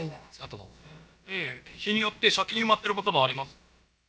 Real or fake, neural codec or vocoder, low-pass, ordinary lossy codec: fake; codec, 16 kHz, about 1 kbps, DyCAST, with the encoder's durations; none; none